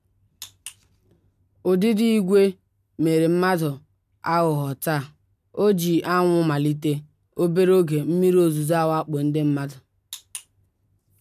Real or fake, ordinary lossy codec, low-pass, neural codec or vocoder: real; none; 14.4 kHz; none